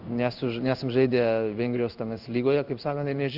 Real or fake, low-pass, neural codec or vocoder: fake; 5.4 kHz; codec, 16 kHz in and 24 kHz out, 1 kbps, XY-Tokenizer